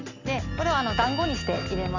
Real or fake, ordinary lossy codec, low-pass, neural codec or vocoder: real; none; 7.2 kHz; none